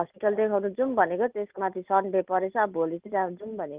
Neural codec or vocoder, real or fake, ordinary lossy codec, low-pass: none; real; Opus, 32 kbps; 3.6 kHz